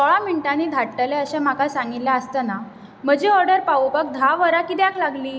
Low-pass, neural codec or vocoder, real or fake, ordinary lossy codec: none; none; real; none